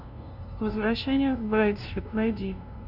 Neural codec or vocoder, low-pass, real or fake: codec, 16 kHz, 0.5 kbps, FunCodec, trained on LibriTTS, 25 frames a second; 5.4 kHz; fake